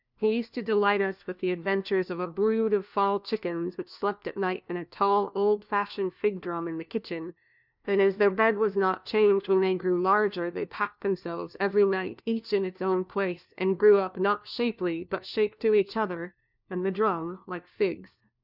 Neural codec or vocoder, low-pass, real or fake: codec, 16 kHz, 1 kbps, FunCodec, trained on LibriTTS, 50 frames a second; 5.4 kHz; fake